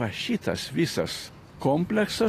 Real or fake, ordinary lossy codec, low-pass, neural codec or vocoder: real; AAC, 48 kbps; 14.4 kHz; none